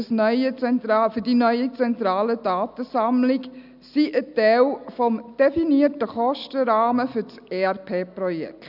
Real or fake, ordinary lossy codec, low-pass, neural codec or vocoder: real; none; 5.4 kHz; none